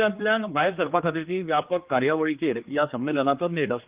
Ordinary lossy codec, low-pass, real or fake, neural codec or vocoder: Opus, 24 kbps; 3.6 kHz; fake; codec, 16 kHz, 2 kbps, X-Codec, HuBERT features, trained on general audio